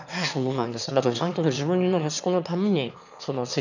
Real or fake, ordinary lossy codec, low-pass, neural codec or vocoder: fake; none; 7.2 kHz; autoencoder, 22.05 kHz, a latent of 192 numbers a frame, VITS, trained on one speaker